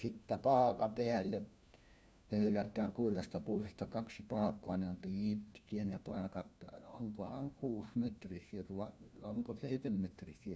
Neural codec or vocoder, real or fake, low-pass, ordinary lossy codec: codec, 16 kHz, 1 kbps, FunCodec, trained on LibriTTS, 50 frames a second; fake; none; none